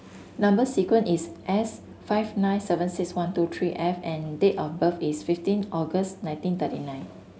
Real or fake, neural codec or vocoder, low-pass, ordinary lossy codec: real; none; none; none